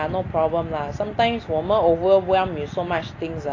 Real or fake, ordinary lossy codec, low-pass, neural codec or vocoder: real; AAC, 32 kbps; 7.2 kHz; none